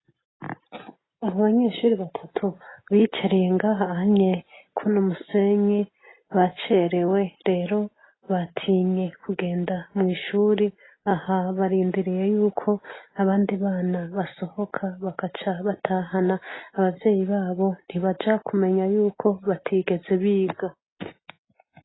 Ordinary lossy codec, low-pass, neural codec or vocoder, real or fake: AAC, 16 kbps; 7.2 kHz; none; real